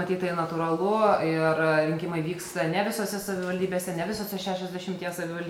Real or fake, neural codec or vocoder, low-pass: real; none; 19.8 kHz